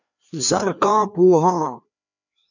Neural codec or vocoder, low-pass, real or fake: codec, 16 kHz, 2 kbps, FreqCodec, larger model; 7.2 kHz; fake